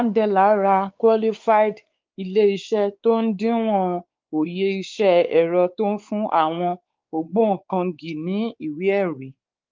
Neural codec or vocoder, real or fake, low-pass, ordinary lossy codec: codec, 16 kHz, 4 kbps, X-Codec, WavLM features, trained on Multilingual LibriSpeech; fake; 7.2 kHz; Opus, 32 kbps